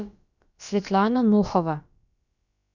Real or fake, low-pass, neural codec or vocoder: fake; 7.2 kHz; codec, 16 kHz, about 1 kbps, DyCAST, with the encoder's durations